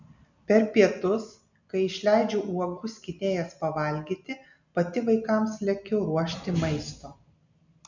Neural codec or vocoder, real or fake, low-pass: none; real; 7.2 kHz